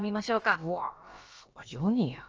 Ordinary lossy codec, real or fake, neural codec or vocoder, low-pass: Opus, 24 kbps; fake; codec, 16 kHz, about 1 kbps, DyCAST, with the encoder's durations; 7.2 kHz